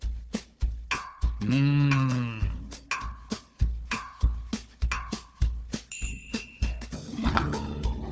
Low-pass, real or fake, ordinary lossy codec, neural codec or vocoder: none; fake; none; codec, 16 kHz, 4 kbps, FunCodec, trained on Chinese and English, 50 frames a second